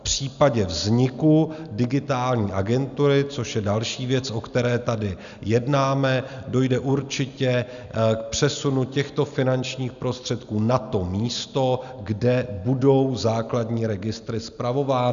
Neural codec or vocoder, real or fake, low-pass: none; real; 7.2 kHz